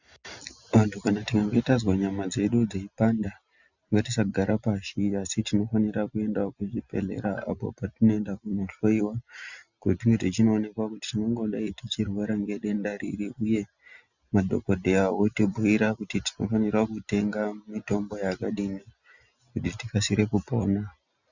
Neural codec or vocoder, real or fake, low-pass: none; real; 7.2 kHz